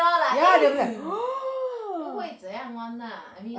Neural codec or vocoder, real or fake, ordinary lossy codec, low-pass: none; real; none; none